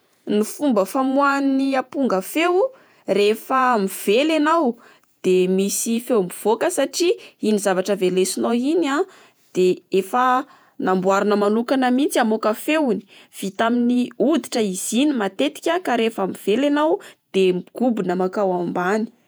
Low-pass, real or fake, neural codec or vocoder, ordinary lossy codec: none; fake; vocoder, 48 kHz, 128 mel bands, Vocos; none